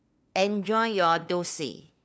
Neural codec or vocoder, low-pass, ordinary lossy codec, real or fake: codec, 16 kHz, 2 kbps, FunCodec, trained on LibriTTS, 25 frames a second; none; none; fake